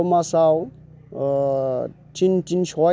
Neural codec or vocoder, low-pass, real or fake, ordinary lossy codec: none; none; real; none